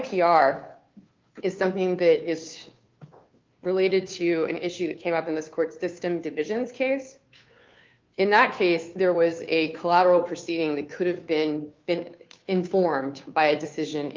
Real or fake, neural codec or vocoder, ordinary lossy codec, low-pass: fake; codec, 16 kHz, 2 kbps, FunCodec, trained on Chinese and English, 25 frames a second; Opus, 24 kbps; 7.2 kHz